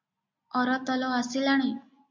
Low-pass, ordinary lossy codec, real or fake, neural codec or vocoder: 7.2 kHz; MP3, 48 kbps; real; none